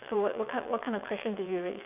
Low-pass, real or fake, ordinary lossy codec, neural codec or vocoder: 3.6 kHz; fake; none; vocoder, 22.05 kHz, 80 mel bands, WaveNeXt